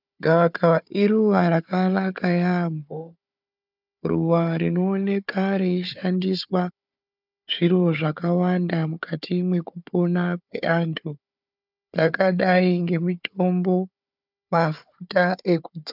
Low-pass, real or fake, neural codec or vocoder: 5.4 kHz; fake; codec, 16 kHz, 4 kbps, FunCodec, trained on Chinese and English, 50 frames a second